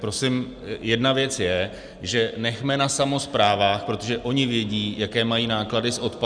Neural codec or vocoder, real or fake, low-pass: none; real; 9.9 kHz